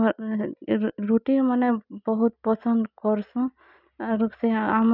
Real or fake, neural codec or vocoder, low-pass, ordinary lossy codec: real; none; 5.4 kHz; none